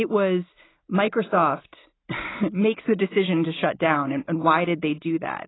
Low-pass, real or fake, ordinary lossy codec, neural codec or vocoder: 7.2 kHz; real; AAC, 16 kbps; none